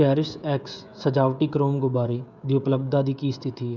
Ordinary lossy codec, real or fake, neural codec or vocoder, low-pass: none; fake; codec, 16 kHz, 16 kbps, FreqCodec, smaller model; 7.2 kHz